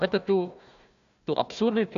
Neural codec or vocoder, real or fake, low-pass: codec, 16 kHz, 1 kbps, FunCodec, trained on Chinese and English, 50 frames a second; fake; 7.2 kHz